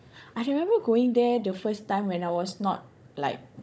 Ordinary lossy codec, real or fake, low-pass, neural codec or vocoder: none; fake; none; codec, 16 kHz, 16 kbps, FunCodec, trained on Chinese and English, 50 frames a second